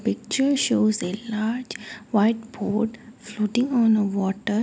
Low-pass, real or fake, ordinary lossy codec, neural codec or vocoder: none; real; none; none